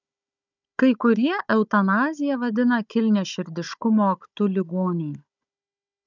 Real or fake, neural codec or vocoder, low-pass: fake; codec, 16 kHz, 16 kbps, FunCodec, trained on Chinese and English, 50 frames a second; 7.2 kHz